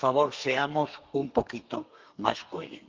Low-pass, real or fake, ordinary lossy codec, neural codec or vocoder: 7.2 kHz; fake; Opus, 24 kbps; codec, 32 kHz, 1.9 kbps, SNAC